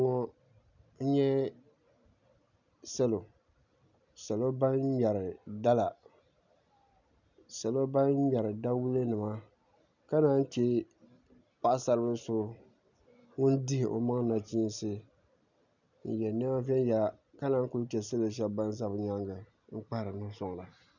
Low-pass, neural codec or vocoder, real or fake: 7.2 kHz; none; real